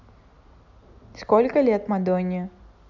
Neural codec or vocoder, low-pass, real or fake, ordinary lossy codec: none; 7.2 kHz; real; none